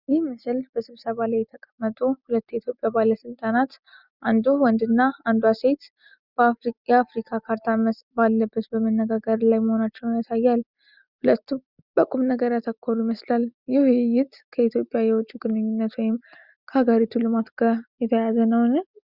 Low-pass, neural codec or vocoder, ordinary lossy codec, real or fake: 5.4 kHz; none; Opus, 64 kbps; real